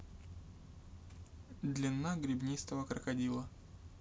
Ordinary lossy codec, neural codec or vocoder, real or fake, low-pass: none; none; real; none